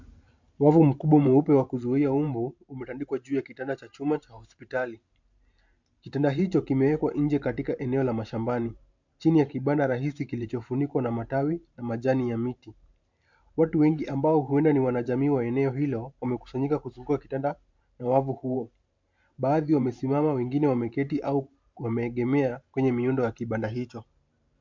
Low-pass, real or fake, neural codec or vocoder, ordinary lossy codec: 7.2 kHz; fake; vocoder, 44.1 kHz, 128 mel bands every 512 samples, BigVGAN v2; MP3, 64 kbps